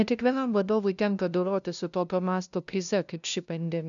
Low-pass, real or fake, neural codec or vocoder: 7.2 kHz; fake; codec, 16 kHz, 0.5 kbps, FunCodec, trained on LibriTTS, 25 frames a second